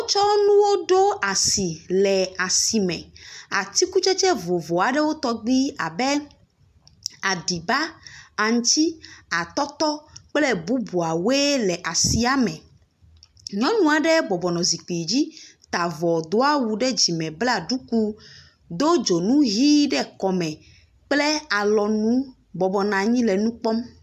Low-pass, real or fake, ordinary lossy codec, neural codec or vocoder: 14.4 kHz; real; AAC, 96 kbps; none